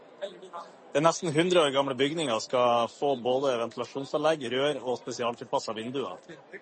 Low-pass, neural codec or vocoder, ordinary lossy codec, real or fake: 10.8 kHz; none; MP3, 32 kbps; real